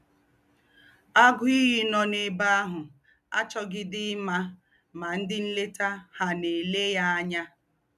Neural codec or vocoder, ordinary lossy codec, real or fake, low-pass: none; none; real; 14.4 kHz